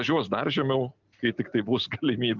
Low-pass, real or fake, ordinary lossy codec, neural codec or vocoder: 7.2 kHz; real; Opus, 24 kbps; none